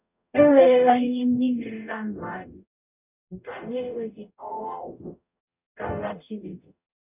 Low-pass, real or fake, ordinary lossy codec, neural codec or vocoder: 3.6 kHz; fake; none; codec, 44.1 kHz, 0.9 kbps, DAC